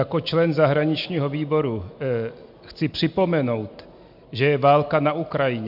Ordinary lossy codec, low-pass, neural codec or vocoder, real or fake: MP3, 48 kbps; 5.4 kHz; none; real